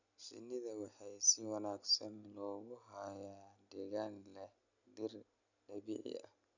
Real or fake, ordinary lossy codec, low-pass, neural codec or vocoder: real; none; 7.2 kHz; none